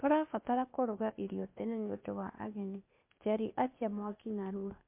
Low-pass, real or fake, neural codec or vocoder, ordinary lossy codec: 3.6 kHz; fake; codec, 16 kHz, 0.8 kbps, ZipCodec; MP3, 32 kbps